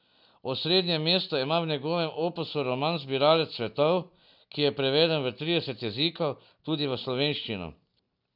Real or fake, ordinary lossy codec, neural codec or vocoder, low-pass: real; none; none; 5.4 kHz